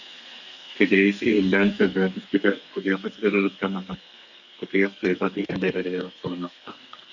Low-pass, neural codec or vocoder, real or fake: 7.2 kHz; codec, 32 kHz, 1.9 kbps, SNAC; fake